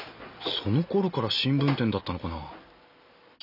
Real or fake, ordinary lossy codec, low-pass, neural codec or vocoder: real; none; 5.4 kHz; none